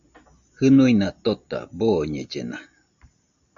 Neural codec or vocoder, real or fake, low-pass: none; real; 7.2 kHz